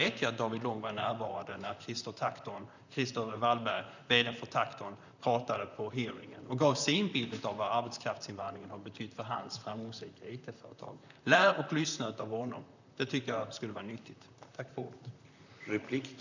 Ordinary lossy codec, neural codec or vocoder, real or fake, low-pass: none; vocoder, 44.1 kHz, 128 mel bands, Pupu-Vocoder; fake; 7.2 kHz